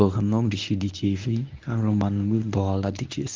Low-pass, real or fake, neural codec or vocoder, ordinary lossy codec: 7.2 kHz; fake; codec, 24 kHz, 0.9 kbps, WavTokenizer, medium speech release version 1; Opus, 32 kbps